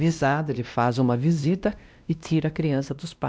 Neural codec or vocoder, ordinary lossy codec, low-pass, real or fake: codec, 16 kHz, 1 kbps, X-Codec, WavLM features, trained on Multilingual LibriSpeech; none; none; fake